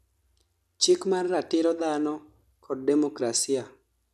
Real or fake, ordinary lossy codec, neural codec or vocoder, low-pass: real; none; none; 14.4 kHz